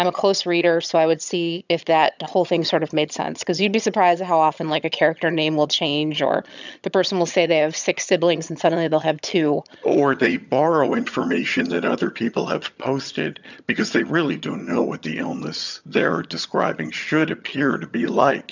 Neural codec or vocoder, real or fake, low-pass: vocoder, 22.05 kHz, 80 mel bands, HiFi-GAN; fake; 7.2 kHz